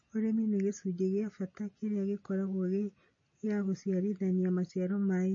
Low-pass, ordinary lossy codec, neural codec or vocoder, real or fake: 7.2 kHz; MP3, 32 kbps; none; real